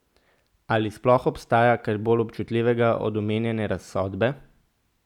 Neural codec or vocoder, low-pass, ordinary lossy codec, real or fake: none; 19.8 kHz; none; real